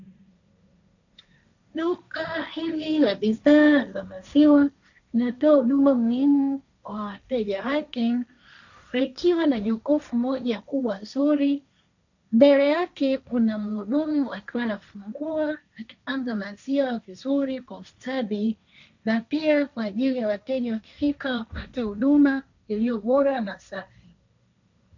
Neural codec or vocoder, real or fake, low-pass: codec, 16 kHz, 1.1 kbps, Voila-Tokenizer; fake; 7.2 kHz